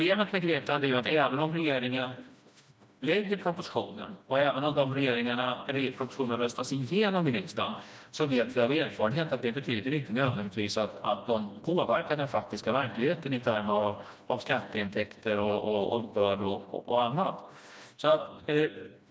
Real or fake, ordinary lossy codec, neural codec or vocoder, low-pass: fake; none; codec, 16 kHz, 1 kbps, FreqCodec, smaller model; none